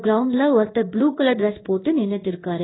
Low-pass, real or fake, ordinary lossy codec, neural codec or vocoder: 7.2 kHz; fake; AAC, 16 kbps; codec, 16 kHz in and 24 kHz out, 1 kbps, XY-Tokenizer